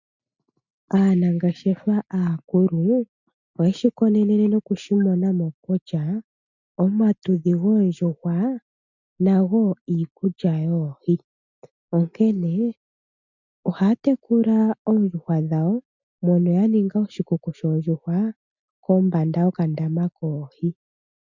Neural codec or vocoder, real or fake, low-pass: none; real; 7.2 kHz